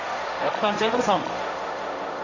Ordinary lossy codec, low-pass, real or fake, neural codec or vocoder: none; 7.2 kHz; fake; codec, 16 kHz, 1.1 kbps, Voila-Tokenizer